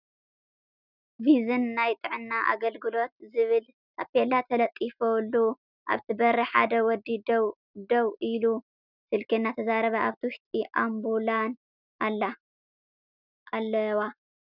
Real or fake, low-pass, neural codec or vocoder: real; 5.4 kHz; none